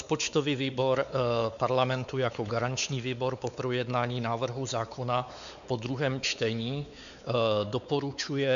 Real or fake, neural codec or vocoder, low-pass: fake; codec, 16 kHz, 4 kbps, X-Codec, WavLM features, trained on Multilingual LibriSpeech; 7.2 kHz